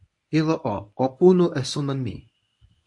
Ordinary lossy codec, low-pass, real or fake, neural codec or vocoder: AAC, 64 kbps; 10.8 kHz; fake; codec, 24 kHz, 0.9 kbps, WavTokenizer, medium speech release version 1